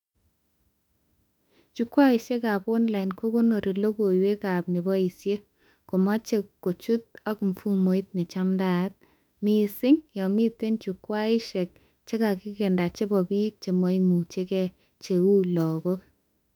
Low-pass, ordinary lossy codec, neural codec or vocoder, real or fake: 19.8 kHz; none; autoencoder, 48 kHz, 32 numbers a frame, DAC-VAE, trained on Japanese speech; fake